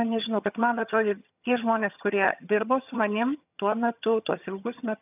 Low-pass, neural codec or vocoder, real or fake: 3.6 kHz; vocoder, 22.05 kHz, 80 mel bands, HiFi-GAN; fake